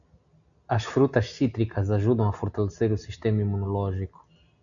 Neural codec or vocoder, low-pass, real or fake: none; 7.2 kHz; real